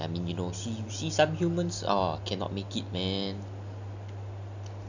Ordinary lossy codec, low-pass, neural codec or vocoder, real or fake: none; 7.2 kHz; none; real